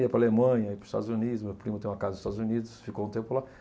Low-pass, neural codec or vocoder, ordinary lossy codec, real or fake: none; none; none; real